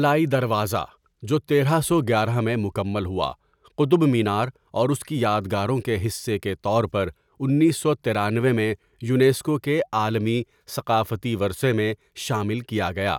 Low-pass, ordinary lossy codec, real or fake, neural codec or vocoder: 19.8 kHz; none; real; none